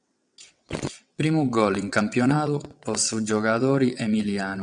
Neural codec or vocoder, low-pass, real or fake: vocoder, 22.05 kHz, 80 mel bands, WaveNeXt; 9.9 kHz; fake